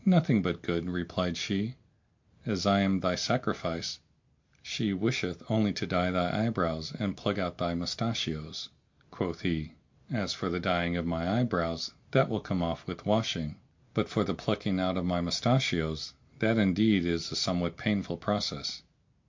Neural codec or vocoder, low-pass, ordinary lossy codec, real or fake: none; 7.2 kHz; MP3, 48 kbps; real